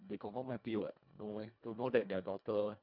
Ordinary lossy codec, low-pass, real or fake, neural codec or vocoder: none; 5.4 kHz; fake; codec, 24 kHz, 1.5 kbps, HILCodec